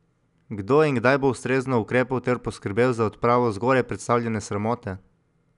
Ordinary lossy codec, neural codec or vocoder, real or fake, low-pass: none; none; real; 10.8 kHz